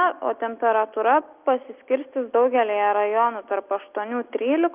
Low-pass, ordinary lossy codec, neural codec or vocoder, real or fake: 3.6 kHz; Opus, 24 kbps; none; real